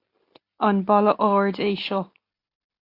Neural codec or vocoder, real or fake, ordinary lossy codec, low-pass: none; real; AAC, 32 kbps; 5.4 kHz